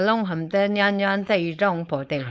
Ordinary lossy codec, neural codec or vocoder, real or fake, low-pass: none; codec, 16 kHz, 4.8 kbps, FACodec; fake; none